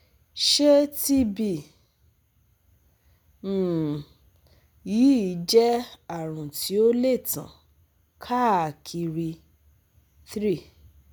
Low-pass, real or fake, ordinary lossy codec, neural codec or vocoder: none; real; none; none